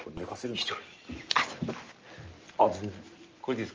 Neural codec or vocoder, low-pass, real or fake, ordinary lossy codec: none; 7.2 kHz; real; Opus, 24 kbps